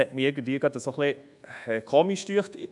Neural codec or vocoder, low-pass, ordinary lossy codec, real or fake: codec, 24 kHz, 1.2 kbps, DualCodec; 10.8 kHz; none; fake